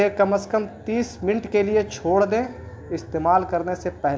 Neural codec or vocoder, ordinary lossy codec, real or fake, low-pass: none; none; real; none